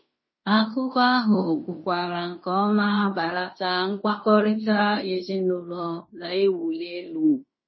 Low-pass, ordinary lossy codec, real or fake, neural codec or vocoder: 7.2 kHz; MP3, 24 kbps; fake; codec, 16 kHz in and 24 kHz out, 0.9 kbps, LongCat-Audio-Codec, fine tuned four codebook decoder